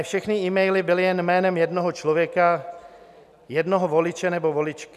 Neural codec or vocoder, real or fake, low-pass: none; real; 14.4 kHz